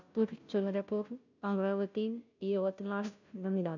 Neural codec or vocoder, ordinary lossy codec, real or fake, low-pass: codec, 16 kHz, 0.5 kbps, FunCodec, trained on Chinese and English, 25 frames a second; none; fake; 7.2 kHz